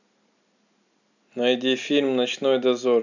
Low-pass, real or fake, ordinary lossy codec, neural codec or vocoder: 7.2 kHz; real; none; none